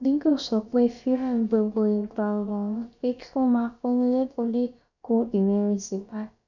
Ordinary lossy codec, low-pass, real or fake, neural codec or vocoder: none; 7.2 kHz; fake; codec, 16 kHz, about 1 kbps, DyCAST, with the encoder's durations